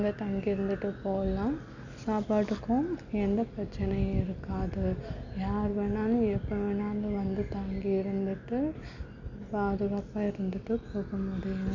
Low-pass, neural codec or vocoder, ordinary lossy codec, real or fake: 7.2 kHz; none; none; real